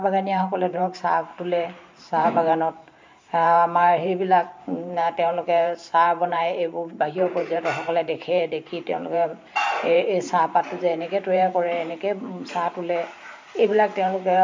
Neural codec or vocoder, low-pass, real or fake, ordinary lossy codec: vocoder, 44.1 kHz, 128 mel bands every 512 samples, BigVGAN v2; 7.2 kHz; fake; MP3, 48 kbps